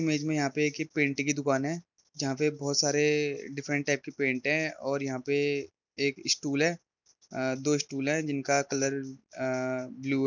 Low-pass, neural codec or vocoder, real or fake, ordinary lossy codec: 7.2 kHz; none; real; none